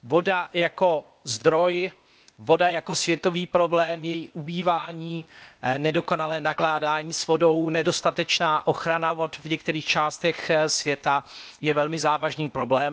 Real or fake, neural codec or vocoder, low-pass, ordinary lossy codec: fake; codec, 16 kHz, 0.8 kbps, ZipCodec; none; none